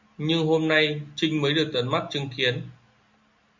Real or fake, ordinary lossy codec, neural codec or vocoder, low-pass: real; MP3, 64 kbps; none; 7.2 kHz